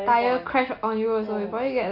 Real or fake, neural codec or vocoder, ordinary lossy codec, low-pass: real; none; none; 5.4 kHz